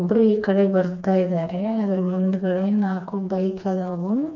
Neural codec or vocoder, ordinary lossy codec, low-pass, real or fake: codec, 16 kHz, 2 kbps, FreqCodec, smaller model; none; 7.2 kHz; fake